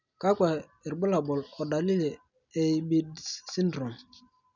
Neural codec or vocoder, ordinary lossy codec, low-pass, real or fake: none; none; 7.2 kHz; real